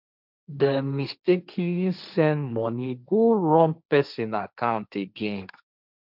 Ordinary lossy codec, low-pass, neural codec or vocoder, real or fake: none; 5.4 kHz; codec, 16 kHz, 1.1 kbps, Voila-Tokenizer; fake